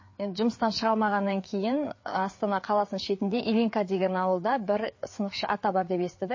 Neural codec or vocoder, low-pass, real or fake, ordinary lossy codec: codec, 16 kHz, 4 kbps, FreqCodec, larger model; 7.2 kHz; fake; MP3, 32 kbps